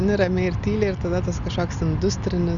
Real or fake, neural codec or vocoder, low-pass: real; none; 7.2 kHz